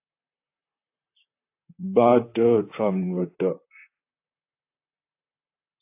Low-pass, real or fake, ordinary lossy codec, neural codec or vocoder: 3.6 kHz; fake; AAC, 24 kbps; vocoder, 44.1 kHz, 128 mel bands, Pupu-Vocoder